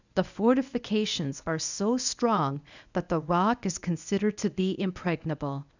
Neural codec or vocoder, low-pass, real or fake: codec, 24 kHz, 0.9 kbps, WavTokenizer, medium speech release version 1; 7.2 kHz; fake